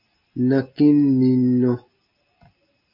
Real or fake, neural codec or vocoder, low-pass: real; none; 5.4 kHz